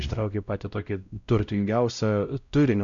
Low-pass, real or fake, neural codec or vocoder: 7.2 kHz; fake; codec, 16 kHz, 0.5 kbps, X-Codec, WavLM features, trained on Multilingual LibriSpeech